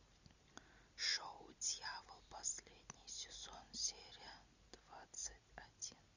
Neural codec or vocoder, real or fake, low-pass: none; real; 7.2 kHz